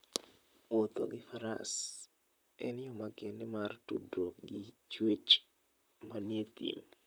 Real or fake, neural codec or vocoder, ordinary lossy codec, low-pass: fake; vocoder, 44.1 kHz, 128 mel bands, Pupu-Vocoder; none; none